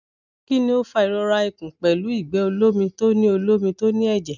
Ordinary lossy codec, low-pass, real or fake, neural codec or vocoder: none; 7.2 kHz; real; none